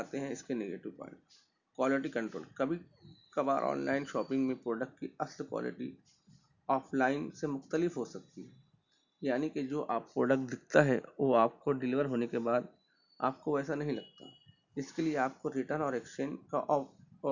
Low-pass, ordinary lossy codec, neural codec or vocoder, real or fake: 7.2 kHz; none; none; real